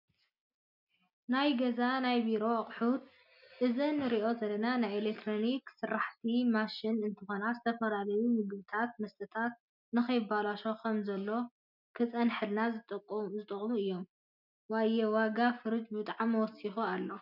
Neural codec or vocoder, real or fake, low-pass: none; real; 5.4 kHz